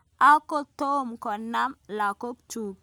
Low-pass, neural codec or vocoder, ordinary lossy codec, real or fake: none; none; none; real